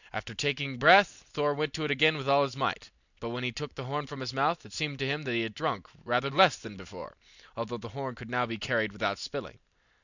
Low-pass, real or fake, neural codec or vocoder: 7.2 kHz; real; none